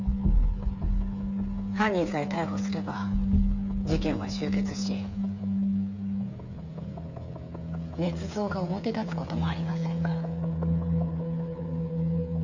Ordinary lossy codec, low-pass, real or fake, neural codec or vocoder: none; 7.2 kHz; fake; codec, 16 kHz, 8 kbps, FreqCodec, smaller model